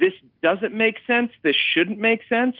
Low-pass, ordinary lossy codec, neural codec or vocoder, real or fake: 5.4 kHz; Opus, 32 kbps; none; real